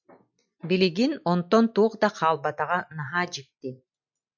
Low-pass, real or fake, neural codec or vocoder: 7.2 kHz; real; none